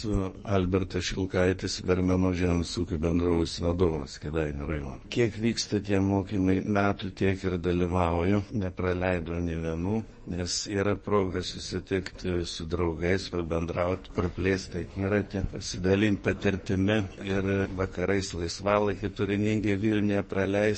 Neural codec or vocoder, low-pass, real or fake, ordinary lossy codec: codec, 44.1 kHz, 2.6 kbps, SNAC; 10.8 kHz; fake; MP3, 32 kbps